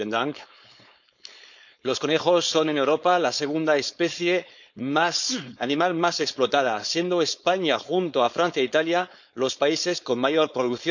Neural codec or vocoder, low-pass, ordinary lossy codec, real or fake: codec, 16 kHz, 4.8 kbps, FACodec; 7.2 kHz; none; fake